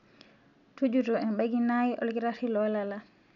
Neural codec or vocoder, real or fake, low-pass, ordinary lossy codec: none; real; 7.2 kHz; none